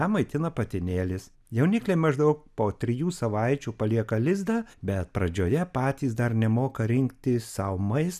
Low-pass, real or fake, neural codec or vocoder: 14.4 kHz; real; none